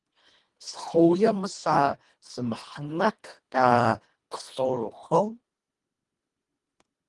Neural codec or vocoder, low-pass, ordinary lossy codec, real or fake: codec, 24 kHz, 1.5 kbps, HILCodec; 10.8 kHz; Opus, 24 kbps; fake